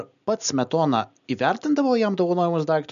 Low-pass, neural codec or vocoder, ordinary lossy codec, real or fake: 7.2 kHz; none; MP3, 96 kbps; real